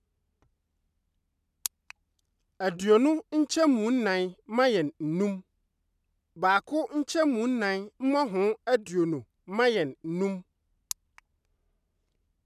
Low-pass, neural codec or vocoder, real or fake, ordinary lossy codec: 14.4 kHz; none; real; none